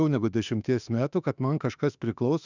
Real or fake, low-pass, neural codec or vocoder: fake; 7.2 kHz; autoencoder, 48 kHz, 32 numbers a frame, DAC-VAE, trained on Japanese speech